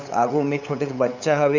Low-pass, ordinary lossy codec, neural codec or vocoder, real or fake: 7.2 kHz; none; codec, 16 kHz, 8 kbps, FunCodec, trained on LibriTTS, 25 frames a second; fake